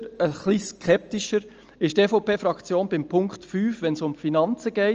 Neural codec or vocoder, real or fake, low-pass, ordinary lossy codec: none; real; 7.2 kHz; Opus, 24 kbps